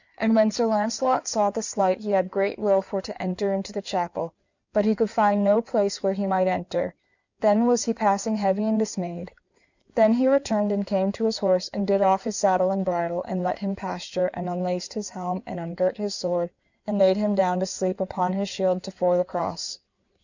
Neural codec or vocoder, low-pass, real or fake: codec, 16 kHz in and 24 kHz out, 2.2 kbps, FireRedTTS-2 codec; 7.2 kHz; fake